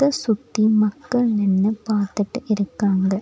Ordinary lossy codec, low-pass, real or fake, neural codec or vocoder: none; none; real; none